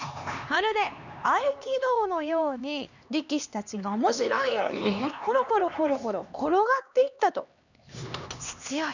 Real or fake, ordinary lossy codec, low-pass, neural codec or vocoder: fake; none; 7.2 kHz; codec, 16 kHz, 2 kbps, X-Codec, HuBERT features, trained on LibriSpeech